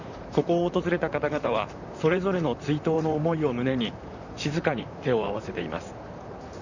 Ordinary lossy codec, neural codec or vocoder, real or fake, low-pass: none; vocoder, 44.1 kHz, 128 mel bands, Pupu-Vocoder; fake; 7.2 kHz